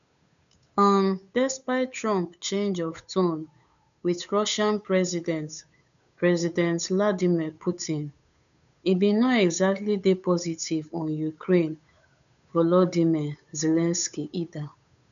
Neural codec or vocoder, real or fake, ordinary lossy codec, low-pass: codec, 16 kHz, 8 kbps, FunCodec, trained on Chinese and English, 25 frames a second; fake; none; 7.2 kHz